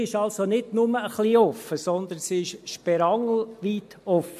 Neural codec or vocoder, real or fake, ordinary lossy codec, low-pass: none; real; MP3, 64 kbps; 14.4 kHz